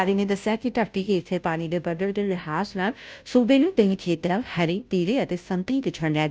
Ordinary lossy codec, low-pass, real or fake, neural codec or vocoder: none; none; fake; codec, 16 kHz, 0.5 kbps, FunCodec, trained on Chinese and English, 25 frames a second